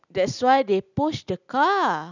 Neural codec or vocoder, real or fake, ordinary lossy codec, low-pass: none; real; none; 7.2 kHz